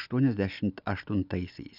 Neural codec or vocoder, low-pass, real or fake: none; 5.4 kHz; real